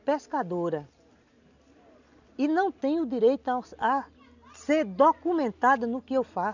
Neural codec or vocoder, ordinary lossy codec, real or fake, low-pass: none; none; real; 7.2 kHz